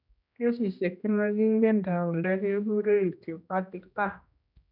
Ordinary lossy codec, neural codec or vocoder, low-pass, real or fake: none; codec, 16 kHz, 1 kbps, X-Codec, HuBERT features, trained on general audio; 5.4 kHz; fake